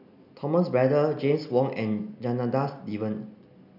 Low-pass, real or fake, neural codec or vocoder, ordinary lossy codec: 5.4 kHz; real; none; none